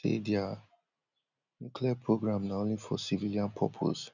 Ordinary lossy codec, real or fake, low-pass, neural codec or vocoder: none; real; 7.2 kHz; none